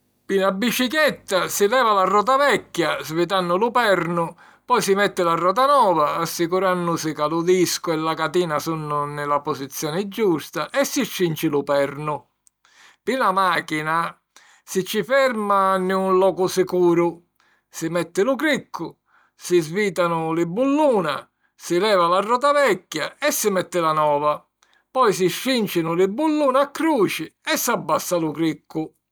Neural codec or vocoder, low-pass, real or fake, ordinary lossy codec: none; none; real; none